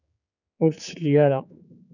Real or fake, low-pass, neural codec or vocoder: fake; 7.2 kHz; codec, 16 kHz, 4 kbps, X-Codec, HuBERT features, trained on general audio